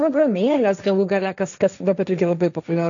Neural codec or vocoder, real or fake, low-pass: codec, 16 kHz, 1.1 kbps, Voila-Tokenizer; fake; 7.2 kHz